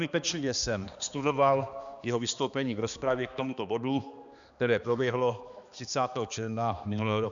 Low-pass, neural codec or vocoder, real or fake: 7.2 kHz; codec, 16 kHz, 2 kbps, X-Codec, HuBERT features, trained on balanced general audio; fake